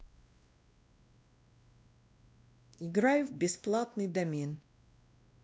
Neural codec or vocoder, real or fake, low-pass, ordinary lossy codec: codec, 16 kHz, 1 kbps, X-Codec, WavLM features, trained on Multilingual LibriSpeech; fake; none; none